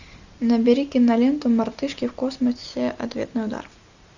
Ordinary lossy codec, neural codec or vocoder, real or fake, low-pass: Opus, 64 kbps; none; real; 7.2 kHz